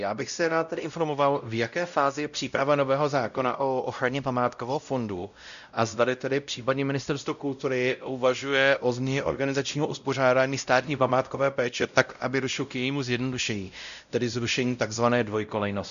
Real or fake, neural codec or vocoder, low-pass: fake; codec, 16 kHz, 0.5 kbps, X-Codec, WavLM features, trained on Multilingual LibriSpeech; 7.2 kHz